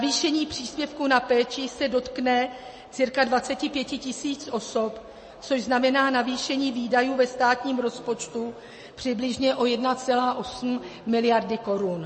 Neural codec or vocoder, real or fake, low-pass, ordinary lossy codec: none; real; 10.8 kHz; MP3, 32 kbps